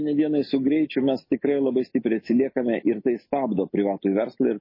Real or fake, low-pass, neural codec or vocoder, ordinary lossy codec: real; 5.4 kHz; none; MP3, 24 kbps